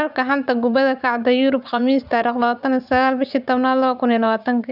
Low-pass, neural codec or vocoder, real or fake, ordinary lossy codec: 5.4 kHz; vocoder, 44.1 kHz, 80 mel bands, Vocos; fake; none